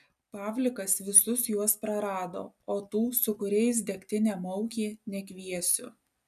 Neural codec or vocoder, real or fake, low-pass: none; real; 14.4 kHz